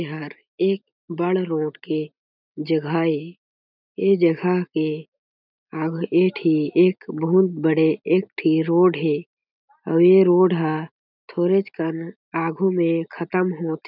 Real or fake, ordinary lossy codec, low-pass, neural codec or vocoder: real; none; 5.4 kHz; none